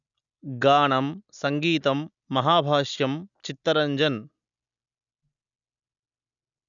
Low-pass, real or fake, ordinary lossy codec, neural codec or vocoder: 7.2 kHz; real; MP3, 96 kbps; none